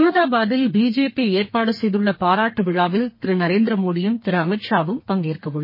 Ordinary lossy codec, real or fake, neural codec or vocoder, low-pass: MP3, 24 kbps; fake; codec, 32 kHz, 1.9 kbps, SNAC; 5.4 kHz